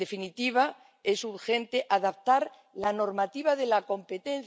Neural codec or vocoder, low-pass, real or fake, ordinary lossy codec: none; none; real; none